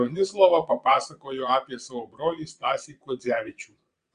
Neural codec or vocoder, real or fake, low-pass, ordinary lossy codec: vocoder, 22.05 kHz, 80 mel bands, WaveNeXt; fake; 9.9 kHz; Opus, 64 kbps